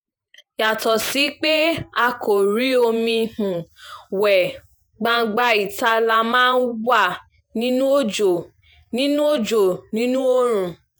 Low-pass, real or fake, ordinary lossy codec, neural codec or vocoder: none; fake; none; vocoder, 48 kHz, 128 mel bands, Vocos